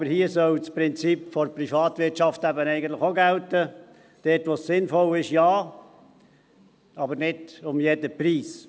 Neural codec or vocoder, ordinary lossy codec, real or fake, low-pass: none; none; real; none